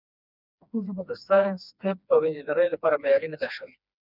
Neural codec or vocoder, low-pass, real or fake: codec, 16 kHz, 2 kbps, FreqCodec, smaller model; 5.4 kHz; fake